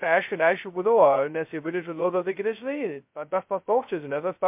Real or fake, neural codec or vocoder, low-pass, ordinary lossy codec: fake; codec, 16 kHz, 0.2 kbps, FocalCodec; 3.6 kHz; MP3, 32 kbps